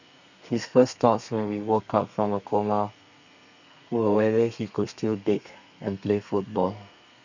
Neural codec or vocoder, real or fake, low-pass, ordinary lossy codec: codec, 32 kHz, 1.9 kbps, SNAC; fake; 7.2 kHz; none